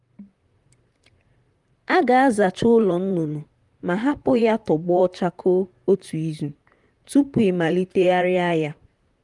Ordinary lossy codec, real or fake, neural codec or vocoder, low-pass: Opus, 32 kbps; fake; vocoder, 44.1 kHz, 128 mel bands, Pupu-Vocoder; 10.8 kHz